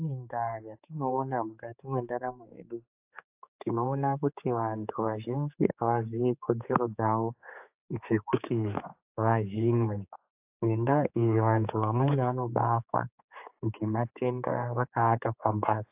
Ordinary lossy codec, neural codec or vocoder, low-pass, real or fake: AAC, 32 kbps; codec, 16 kHz, 4 kbps, X-Codec, HuBERT features, trained on general audio; 3.6 kHz; fake